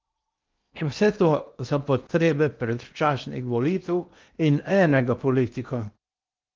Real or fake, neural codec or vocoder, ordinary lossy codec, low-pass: fake; codec, 16 kHz in and 24 kHz out, 0.8 kbps, FocalCodec, streaming, 65536 codes; Opus, 24 kbps; 7.2 kHz